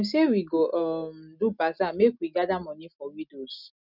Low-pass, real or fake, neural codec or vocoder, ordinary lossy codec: 5.4 kHz; real; none; none